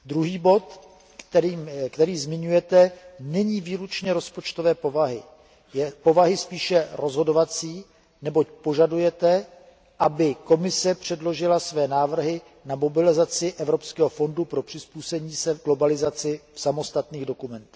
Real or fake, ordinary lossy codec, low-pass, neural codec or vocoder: real; none; none; none